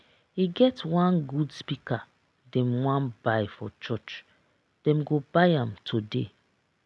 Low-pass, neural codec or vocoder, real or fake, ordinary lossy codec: none; none; real; none